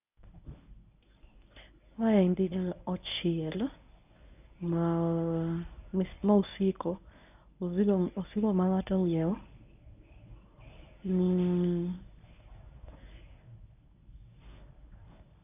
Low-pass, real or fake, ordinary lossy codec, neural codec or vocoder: 3.6 kHz; fake; none; codec, 24 kHz, 0.9 kbps, WavTokenizer, medium speech release version 1